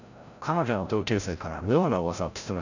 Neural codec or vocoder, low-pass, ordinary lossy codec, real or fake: codec, 16 kHz, 0.5 kbps, FreqCodec, larger model; 7.2 kHz; none; fake